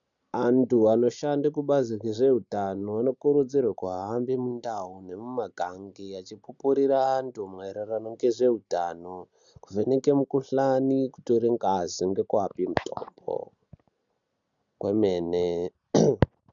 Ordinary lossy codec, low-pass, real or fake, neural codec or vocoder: MP3, 96 kbps; 7.2 kHz; real; none